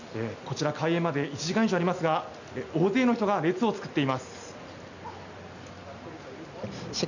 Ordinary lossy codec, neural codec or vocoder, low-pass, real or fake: none; none; 7.2 kHz; real